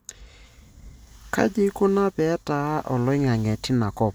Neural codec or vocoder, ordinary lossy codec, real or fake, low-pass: none; none; real; none